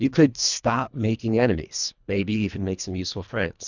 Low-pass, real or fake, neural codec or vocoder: 7.2 kHz; fake; codec, 24 kHz, 1.5 kbps, HILCodec